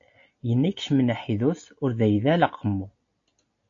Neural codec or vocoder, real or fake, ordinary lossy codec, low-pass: none; real; AAC, 48 kbps; 7.2 kHz